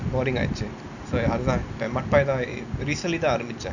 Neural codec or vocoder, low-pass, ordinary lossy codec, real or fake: none; 7.2 kHz; none; real